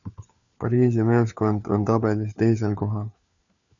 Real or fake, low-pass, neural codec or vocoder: fake; 7.2 kHz; codec, 16 kHz, 4 kbps, FunCodec, trained on LibriTTS, 50 frames a second